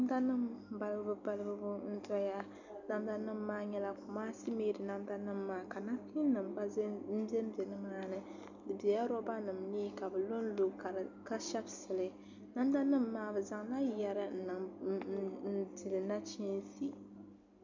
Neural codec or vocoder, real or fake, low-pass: none; real; 7.2 kHz